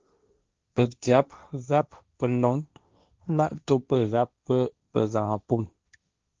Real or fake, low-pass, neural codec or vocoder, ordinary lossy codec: fake; 7.2 kHz; codec, 16 kHz, 1.1 kbps, Voila-Tokenizer; Opus, 24 kbps